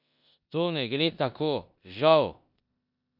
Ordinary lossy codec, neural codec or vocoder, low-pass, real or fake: none; codec, 16 kHz in and 24 kHz out, 0.9 kbps, LongCat-Audio-Codec, four codebook decoder; 5.4 kHz; fake